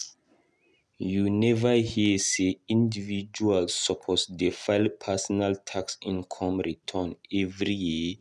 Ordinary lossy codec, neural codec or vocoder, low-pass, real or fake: none; none; none; real